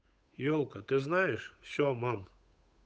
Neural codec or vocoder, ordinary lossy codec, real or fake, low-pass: codec, 16 kHz, 8 kbps, FunCodec, trained on Chinese and English, 25 frames a second; none; fake; none